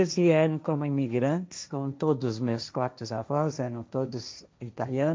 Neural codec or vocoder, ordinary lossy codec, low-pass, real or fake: codec, 16 kHz, 1.1 kbps, Voila-Tokenizer; none; none; fake